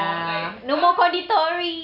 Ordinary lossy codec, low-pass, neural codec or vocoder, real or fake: none; 5.4 kHz; none; real